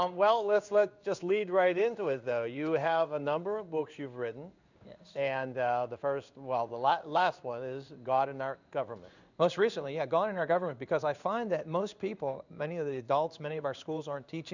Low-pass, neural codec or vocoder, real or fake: 7.2 kHz; codec, 16 kHz in and 24 kHz out, 1 kbps, XY-Tokenizer; fake